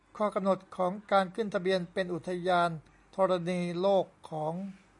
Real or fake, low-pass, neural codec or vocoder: real; 10.8 kHz; none